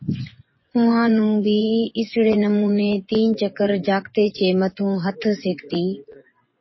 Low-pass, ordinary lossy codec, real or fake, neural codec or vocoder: 7.2 kHz; MP3, 24 kbps; fake; vocoder, 24 kHz, 100 mel bands, Vocos